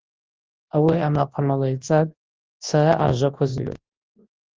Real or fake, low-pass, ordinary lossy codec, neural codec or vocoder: fake; 7.2 kHz; Opus, 16 kbps; codec, 24 kHz, 0.9 kbps, WavTokenizer, large speech release